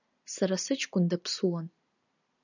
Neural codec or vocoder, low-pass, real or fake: none; 7.2 kHz; real